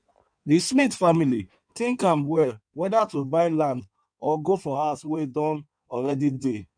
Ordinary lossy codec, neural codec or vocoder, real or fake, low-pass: none; codec, 16 kHz in and 24 kHz out, 1.1 kbps, FireRedTTS-2 codec; fake; 9.9 kHz